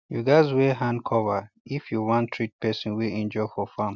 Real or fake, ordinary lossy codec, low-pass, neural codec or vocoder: real; none; 7.2 kHz; none